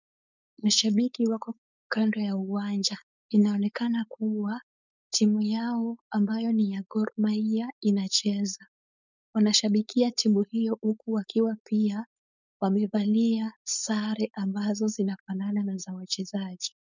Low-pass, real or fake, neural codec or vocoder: 7.2 kHz; fake; codec, 16 kHz, 4.8 kbps, FACodec